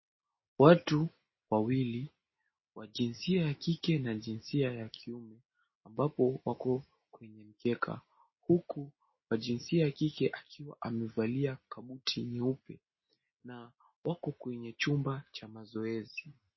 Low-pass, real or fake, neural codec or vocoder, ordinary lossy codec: 7.2 kHz; real; none; MP3, 24 kbps